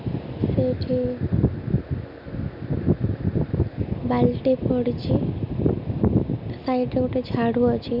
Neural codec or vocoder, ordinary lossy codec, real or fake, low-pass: none; none; real; 5.4 kHz